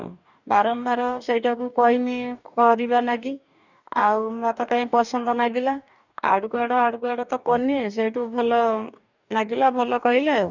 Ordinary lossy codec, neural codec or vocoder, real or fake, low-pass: none; codec, 44.1 kHz, 2.6 kbps, DAC; fake; 7.2 kHz